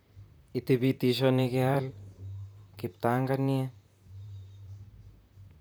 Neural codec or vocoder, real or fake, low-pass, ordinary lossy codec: vocoder, 44.1 kHz, 128 mel bands, Pupu-Vocoder; fake; none; none